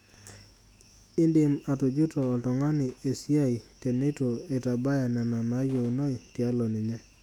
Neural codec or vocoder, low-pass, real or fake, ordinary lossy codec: autoencoder, 48 kHz, 128 numbers a frame, DAC-VAE, trained on Japanese speech; 19.8 kHz; fake; none